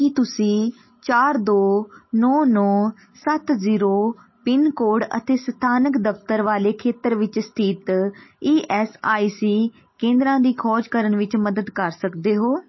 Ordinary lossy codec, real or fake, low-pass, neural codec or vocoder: MP3, 24 kbps; real; 7.2 kHz; none